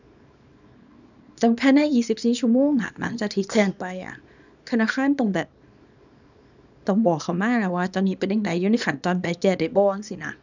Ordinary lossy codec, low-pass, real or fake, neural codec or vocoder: none; 7.2 kHz; fake; codec, 24 kHz, 0.9 kbps, WavTokenizer, small release